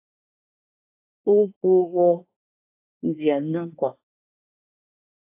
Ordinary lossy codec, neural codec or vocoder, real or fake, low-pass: AAC, 24 kbps; codec, 24 kHz, 1 kbps, SNAC; fake; 3.6 kHz